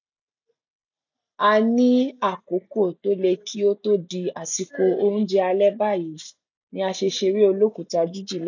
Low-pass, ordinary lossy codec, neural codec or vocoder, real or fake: 7.2 kHz; none; none; real